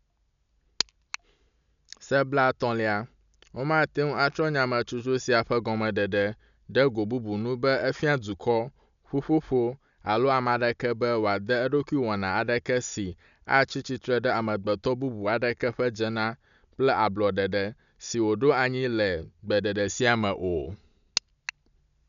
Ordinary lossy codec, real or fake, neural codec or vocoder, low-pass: none; real; none; 7.2 kHz